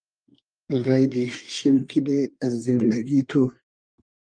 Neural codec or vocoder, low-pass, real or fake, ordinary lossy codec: codec, 24 kHz, 1 kbps, SNAC; 9.9 kHz; fake; Opus, 32 kbps